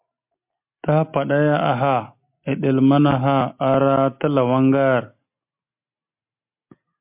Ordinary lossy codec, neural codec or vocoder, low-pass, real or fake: MP3, 32 kbps; none; 3.6 kHz; real